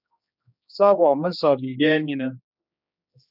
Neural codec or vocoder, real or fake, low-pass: codec, 16 kHz, 2 kbps, X-Codec, HuBERT features, trained on general audio; fake; 5.4 kHz